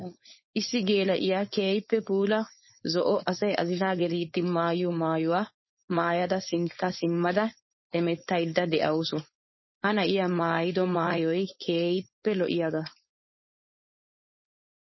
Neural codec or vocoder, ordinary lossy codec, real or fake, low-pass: codec, 16 kHz, 4.8 kbps, FACodec; MP3, 24 kbps; fake; 7.2 kHz